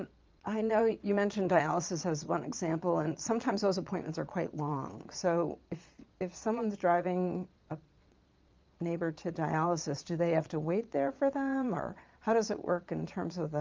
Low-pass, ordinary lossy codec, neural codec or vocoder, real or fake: 7.2 kHz; Opus, 24 kbps; vocoder, 22.05 kHz, 80 mel bands, Vocos; fake